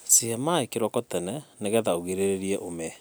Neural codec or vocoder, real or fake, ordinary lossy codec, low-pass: none; real; none; none